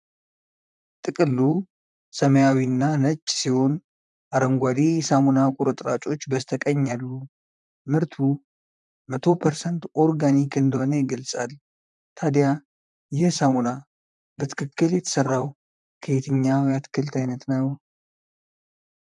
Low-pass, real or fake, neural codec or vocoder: 10.8 kHz; fake; vocoder, 44.1 kHz, 128 mel bands, Pupu-Vocoder